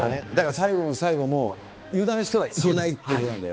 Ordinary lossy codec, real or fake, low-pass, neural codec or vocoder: none; fake; none; codec, 16 kHz, 2 kbps, X-Codec, HuBERT features, trained on balanced general audio